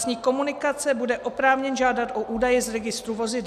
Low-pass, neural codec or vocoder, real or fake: 14.4 kHz; none; real